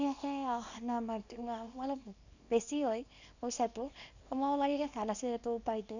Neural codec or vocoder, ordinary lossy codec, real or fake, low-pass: codec, 24 kHz, 0.9 kbps, WavTokenizer, small release; none; fake; 7.2 kHz